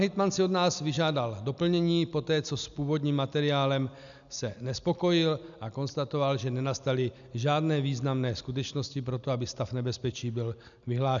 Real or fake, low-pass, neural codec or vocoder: real; 7.2 kHz; none